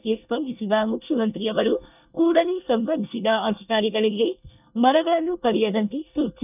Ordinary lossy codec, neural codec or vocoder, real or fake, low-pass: none; codec, 24 kHz, 1 kbps, SNAC; fake; 3.6 kHz